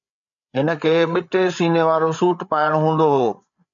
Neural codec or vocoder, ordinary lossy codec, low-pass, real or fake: codec, 16 kHz, 8 kbps, FreqCodec, larger model; MP3, 96 kbps; 7.2 kHz; fake